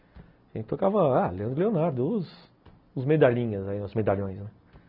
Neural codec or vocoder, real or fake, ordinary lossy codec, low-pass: none; real; none; 5.4 kHz